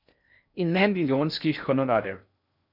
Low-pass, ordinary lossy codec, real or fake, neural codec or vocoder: 5.4 kHz; none; fake; codec, 16 kHz in and 24 kHz out, 0.6 kbps, FocalCodec, streaming, 2048 codes